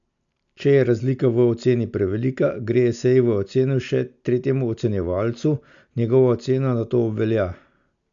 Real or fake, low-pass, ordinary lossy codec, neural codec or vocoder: real; 7.2 kHz; MP3, 64 kbps; none